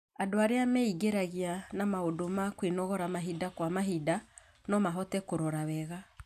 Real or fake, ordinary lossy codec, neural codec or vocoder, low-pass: real; none; none; 14.4 kHz